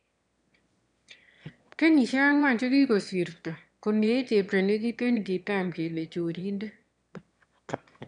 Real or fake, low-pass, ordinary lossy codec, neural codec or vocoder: fake; 9.9 kHz; none; autoencoder, 22.05 kHz, a latent of 192 numbers a frame, VITS, trained on one speaker